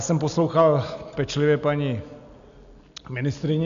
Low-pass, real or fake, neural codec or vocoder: 7.2 kHz; real; none